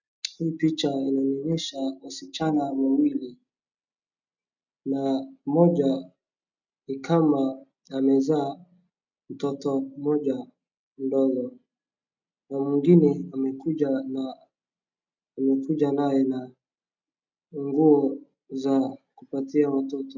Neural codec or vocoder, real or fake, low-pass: none; real; 7.2 kHz